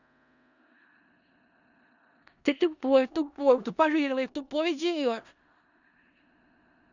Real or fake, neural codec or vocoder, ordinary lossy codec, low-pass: fake; codec, 16 kHz in and 24 kHz out, 0.4 kbps, LongCat-Audio-Codec, four codebook decoder; none; 7.2 kHz